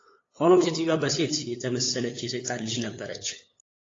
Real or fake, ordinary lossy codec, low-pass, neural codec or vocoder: fake; AAC, 32 kbps; 7.2 kHz; codec, 16 kHz, 8 kbps, FunCodec, trained on LibriTTS, 25 frames a second